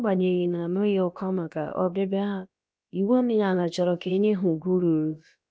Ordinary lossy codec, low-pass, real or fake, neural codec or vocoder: none; none; fake; codec, 16 kHz, about 1 kbps, DyCAST, with the encoder's durations